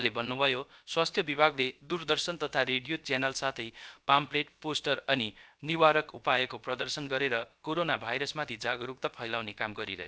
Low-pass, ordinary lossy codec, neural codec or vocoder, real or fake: none; none; codec, 16 kHz, about 1 kbps, DyCAST, with the encoder's durations; fake